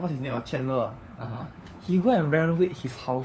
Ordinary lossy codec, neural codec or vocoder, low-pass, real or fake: none; codec, 16 kHz, 4 kbps, FunCodec, trained on LibriTTS, 50 frames a second; none; fake